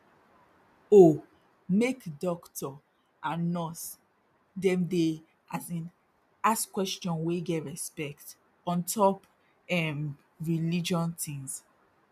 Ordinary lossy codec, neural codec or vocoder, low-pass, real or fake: none; none; 14.4 kHz; real